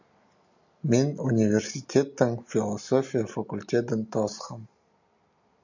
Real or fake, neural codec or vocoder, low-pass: real; none; 7.2 kHz